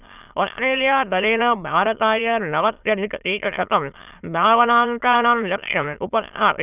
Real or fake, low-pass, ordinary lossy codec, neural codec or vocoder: fake; 3.6 kHz; none; autoencoder, 22.05 kHz, a latent of 192 numbers a frame, VITS, trained on many speakers